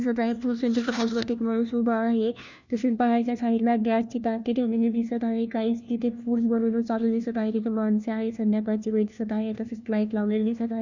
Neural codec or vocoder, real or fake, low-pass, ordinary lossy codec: codec, 16 kHz, 1 kbps, FunCodec, trained on LibriTTS, 50 frames a second; fake; 7.2 kHz; none